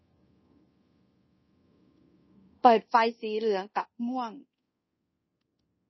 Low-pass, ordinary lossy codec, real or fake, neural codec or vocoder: 7.2 kHz; MP3, 24 kbps; fake; codec, 24 kHz, 0.5 kbps, DualCodec